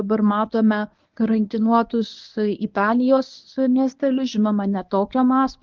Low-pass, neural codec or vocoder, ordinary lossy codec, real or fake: 7.2 kHz; codec, 24 kHz, 0.9 kbps, WavTokenizer, medium speech release version 1; Opus, 32 kbps; fake